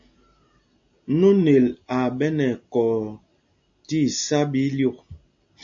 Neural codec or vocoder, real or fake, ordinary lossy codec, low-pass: none; real; AAC, 64 kbps; 7.2 kHz